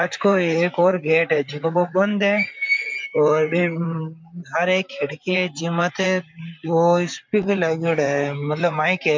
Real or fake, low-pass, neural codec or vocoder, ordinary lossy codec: fake; 7.2 kHz; vocoder, 44.1 kHz, 128 mel bands, Pupu-Vocoder; MP3, 64 kbps